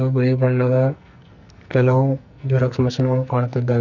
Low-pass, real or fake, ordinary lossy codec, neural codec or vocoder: 7.2 kHz; fake; none; codec, 44.1 kHz, 2.6 kbps, SNAC